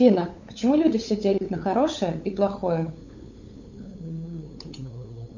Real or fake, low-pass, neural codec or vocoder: fake; 7.2 kHz; codec, 16 kHz, 8 kbps, FunCodec, trained on LibriTTS, 25 frames a second